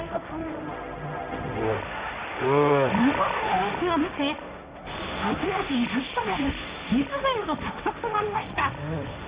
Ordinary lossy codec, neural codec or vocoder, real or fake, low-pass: Opus, 64 kbps; codec, 16 kHz, 1.1 kbps, Voila-Tokenizer; fake; 3.6 kHz